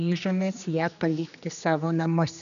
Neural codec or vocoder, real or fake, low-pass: codec, 16 kHz, 2 kbps, X-Codec, HuBERT features, trained on general audio; fake; 7.2 kHz